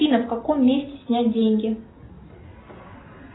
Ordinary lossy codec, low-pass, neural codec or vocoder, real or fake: AAC, 16 kbps; 7.2 kHz; none; real